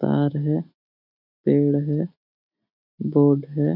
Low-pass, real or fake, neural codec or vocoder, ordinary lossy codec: 5.4 kHz; real; none; none